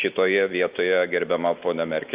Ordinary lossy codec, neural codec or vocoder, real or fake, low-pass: Opus, 24 kbps; none; real; 3.6 kHz